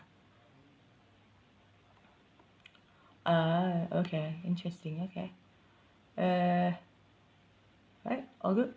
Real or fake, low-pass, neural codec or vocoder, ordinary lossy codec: real; none; none; none